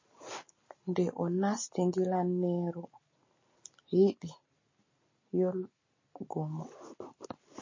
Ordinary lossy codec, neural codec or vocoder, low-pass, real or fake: MP3, 32 kbps; none; 7.2 kHz; real